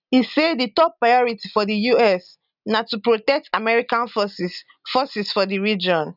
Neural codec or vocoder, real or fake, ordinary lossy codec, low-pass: none; real; none; 5.4 kHz